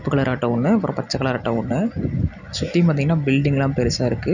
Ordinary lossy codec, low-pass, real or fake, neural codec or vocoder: none; 7.2 kHz; real; none